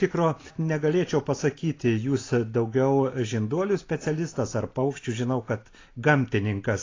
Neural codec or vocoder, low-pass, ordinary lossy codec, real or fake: none; 7.2 kHz; AAC, 32 kbps; real